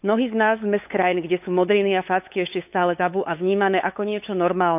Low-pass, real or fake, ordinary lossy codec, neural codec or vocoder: 3.6 kHz; fake; AAC, 32 kbps; codec, 16 kHz, 8 kbps, FunCodec, trained on LibriTTS, 25 frames a second